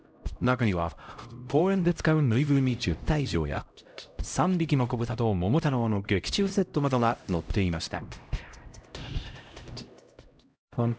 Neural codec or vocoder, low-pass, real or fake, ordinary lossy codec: codec, 16 kHz, 0.5 kbps, X-Codec, HuBERT features, trained on LibriSpeech; none; fake; none